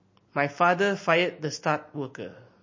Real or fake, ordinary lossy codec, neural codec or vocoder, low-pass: real; MP3, 32 kbps; none; 7.2 kHz